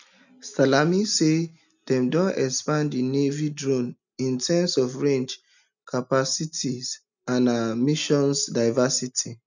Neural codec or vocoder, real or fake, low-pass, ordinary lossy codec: none; real; 7.2 kHz; none